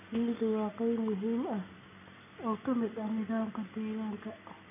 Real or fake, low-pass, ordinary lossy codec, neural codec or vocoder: real; 3.6 kHz; none; none